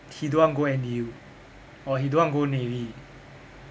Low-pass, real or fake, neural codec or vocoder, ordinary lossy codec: none; real; none; none